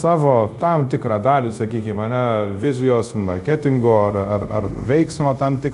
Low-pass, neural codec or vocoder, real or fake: 10.8 kHz; codec, 24 kHz, 0.5 kbps, DualCodec; fake